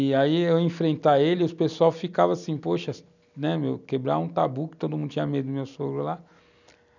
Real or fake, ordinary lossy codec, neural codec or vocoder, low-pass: real; none; none; 7.2 kHz